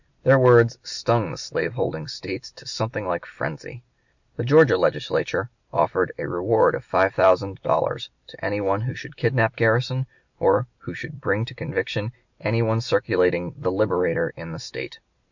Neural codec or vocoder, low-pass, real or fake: none; 7.2 kHz; real